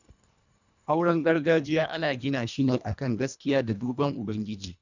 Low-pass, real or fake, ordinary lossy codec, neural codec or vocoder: 7.2 kHz; fake; none; codec, 24 kHz, 1.5 kbps, HILCodec